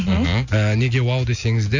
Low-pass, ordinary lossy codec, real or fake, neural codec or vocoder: 7.2 kHz; none; real; none